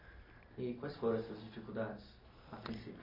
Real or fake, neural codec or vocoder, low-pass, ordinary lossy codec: real; none; 5.4 kHz; AAC, 32 kbps